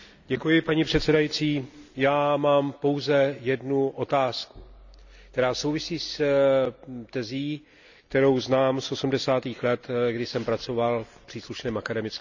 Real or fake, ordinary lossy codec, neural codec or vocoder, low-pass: real; none; none; 7.2 kHz